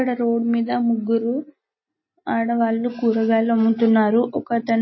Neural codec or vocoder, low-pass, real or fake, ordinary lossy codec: none; 7.2 kHz; real; MP3, 24 kbps